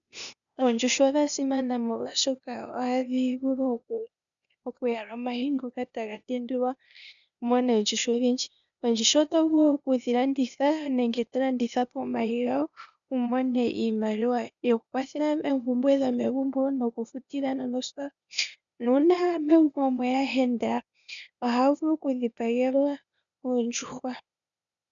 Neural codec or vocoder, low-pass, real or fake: codec, 16 kHz, 0.8 kbps, ZipCodec; 7.2 kHz; fake